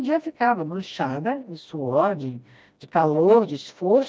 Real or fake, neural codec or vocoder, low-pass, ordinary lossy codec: fake; codec, 16 kHz, 1 kbps, FreqCodec, smaller model; none; none